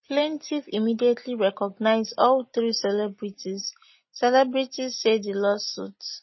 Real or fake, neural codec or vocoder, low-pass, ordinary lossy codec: real; none; 7.2 kHz; MP3, 24 kbps